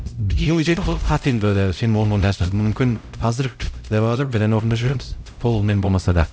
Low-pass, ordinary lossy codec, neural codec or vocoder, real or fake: none; none; codec, 16 kHz, 0.5 kbps, X-Codec, HuBERT features, trained on LibriSpeech; fake